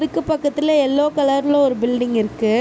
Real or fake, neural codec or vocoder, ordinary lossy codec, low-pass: real; none; none; none